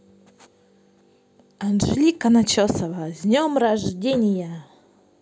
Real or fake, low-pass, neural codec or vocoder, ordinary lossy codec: real; none; none; none